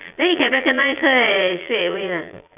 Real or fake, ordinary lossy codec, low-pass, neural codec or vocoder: fake; none; 3.6 kHz; vocoder, 22.05 kHz, 80 mel bands, Vocos